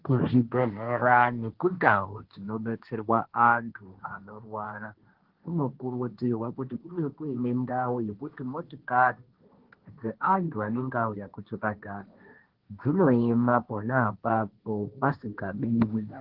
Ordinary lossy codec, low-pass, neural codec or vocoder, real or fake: Opus, 16 kbps; 5.4 kHz; codec, 16 kHz, 1.1 kbps, Voila-Tokenizer; fake